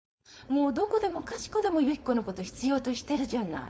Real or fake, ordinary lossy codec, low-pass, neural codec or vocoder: fake; none; none; codec, 16 kHz, 4.8 kbps, FACodec